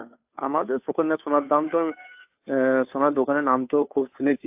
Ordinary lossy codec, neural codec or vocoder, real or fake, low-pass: AAC, 32 kbps; codec, 16 kHz, 2 kbps, FunCodec, trained on Chinese and English, 25 frames a second; fake; 3.6 kHz